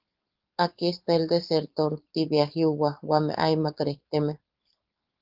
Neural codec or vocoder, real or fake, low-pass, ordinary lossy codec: codec, 16 kHz, 4.8 kbps, FACodec; fake; 5.4 kHz; Opus, 32 kbps